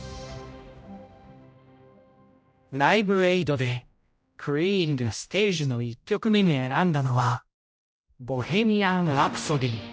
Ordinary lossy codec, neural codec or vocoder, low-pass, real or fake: none; codec, 16 kHz, 0.5 kbps, X-Codec, HuBERT features, trained on general audio; none; fake